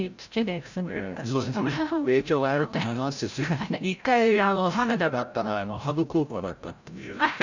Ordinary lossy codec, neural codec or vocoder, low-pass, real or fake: none; codec, 16 kHz, 0.5 kbps, FreqCodec, larger model; 7.2 kHz; fake